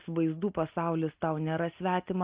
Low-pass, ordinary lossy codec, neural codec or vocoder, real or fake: 3.6 kHz; Opus, 32 kbps; none; real